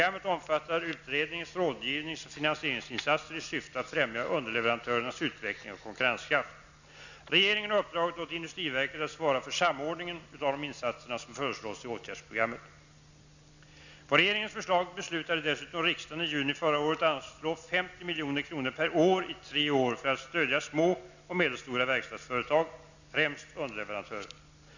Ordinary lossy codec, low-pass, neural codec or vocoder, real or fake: none; 7.2 kHz; none; real